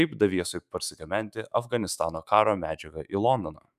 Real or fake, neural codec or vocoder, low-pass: fake; autoencoder, 48 kHz, 128 numbers a frame, DAC-VAE, trained on Japanese speech; 14.4 kHz